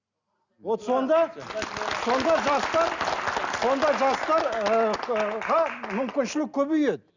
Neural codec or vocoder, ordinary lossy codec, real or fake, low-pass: none; Opus, 64 kbps; real; 7.2 kHz